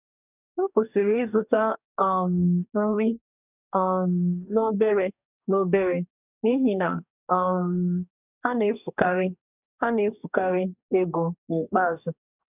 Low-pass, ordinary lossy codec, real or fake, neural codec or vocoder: 3.6 kHz; none; fake; codec, 44.1 kHz, 2.6 kbps, DAC